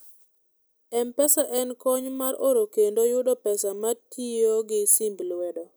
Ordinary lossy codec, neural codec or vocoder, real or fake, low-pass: none; none; real; none